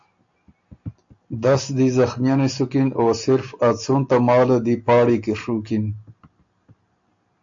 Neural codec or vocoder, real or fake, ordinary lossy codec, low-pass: none; real; AAC, 64 kbps; 7.2 kHz